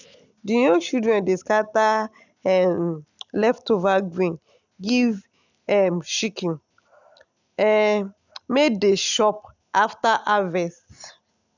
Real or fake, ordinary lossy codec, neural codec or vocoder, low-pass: real; none; none; 7.2 kHz